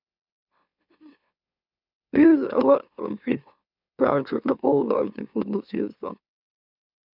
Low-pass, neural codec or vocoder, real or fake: 5.4 kHz; autoencoder, 44.1 kHz, a latent of 192 numbers a frame, MeloTTS; fake